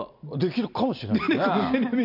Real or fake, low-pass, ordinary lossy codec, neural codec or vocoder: real; 5.4 kHz; none; none